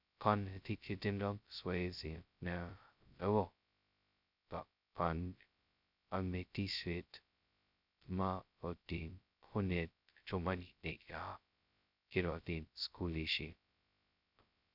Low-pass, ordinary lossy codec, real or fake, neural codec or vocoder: 5.4 kHz; none; fake; codec, 16 kHz, 0.2 kbps, FocalCodec